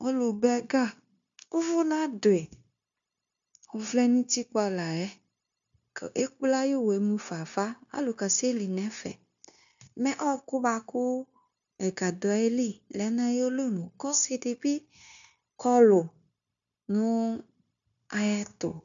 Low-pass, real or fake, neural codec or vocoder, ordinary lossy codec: 7.2 kHz; fake; codec, 16 kHz, 0.9 kbps, LongCat-Audio-Codec; MP3, 64 kbps